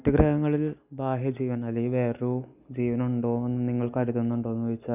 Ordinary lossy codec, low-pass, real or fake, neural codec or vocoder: none; 3.6 kHz; real; none